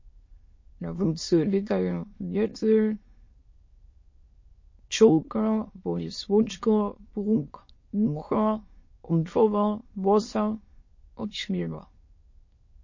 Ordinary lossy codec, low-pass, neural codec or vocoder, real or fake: MP3, 32 kbps; 7.2 kHz; autoencoder, 22.05 kHz, a latent of 192 numbers a frame, VITS, trained on many speakers; fake